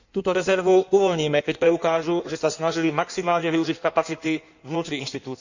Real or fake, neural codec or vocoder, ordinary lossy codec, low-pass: fake; codec, 16 kHz in and 24 kHz out, 1.1 kbps, FireRedTTS-2 codec; none; 7.2 kHz